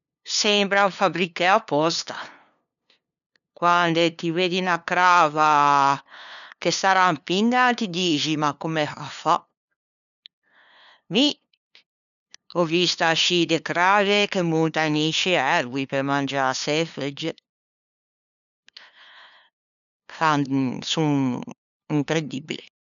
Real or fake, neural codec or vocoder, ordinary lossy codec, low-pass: fake; codec, 16 kHz, 2 kbps, FunCodec, trained on LibriTTS, 25 frames a second; none; 7.2 kHz